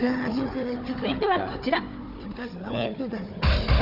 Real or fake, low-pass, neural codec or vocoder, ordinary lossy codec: fake; 5.4 kHz; codec, 16 kHz, 4 kbps, FunCodec, trained on Chinese and English, 50 frames a second; none